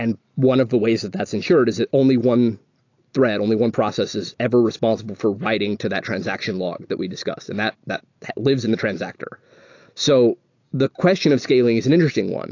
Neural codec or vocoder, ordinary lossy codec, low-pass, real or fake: none; AAC, 48 kbps; 7.2 kHz; real